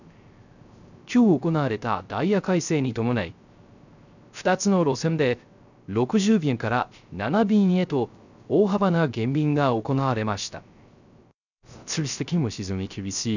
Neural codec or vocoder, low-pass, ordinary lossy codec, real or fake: codec, 16 kHz, 0.3 kbps, FocalCodec; 7.2 kHz; none; fake